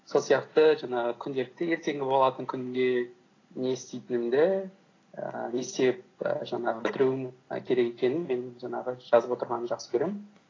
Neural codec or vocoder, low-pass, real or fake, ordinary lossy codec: none; 7.2 kHz; real; AAC, 32 kbps